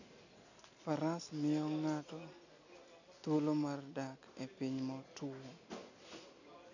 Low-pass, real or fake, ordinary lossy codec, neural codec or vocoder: 7.2 kHz; real; none; none